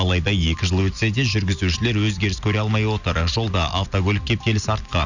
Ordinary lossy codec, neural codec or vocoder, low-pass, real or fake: none; none; 7.2 kHz; real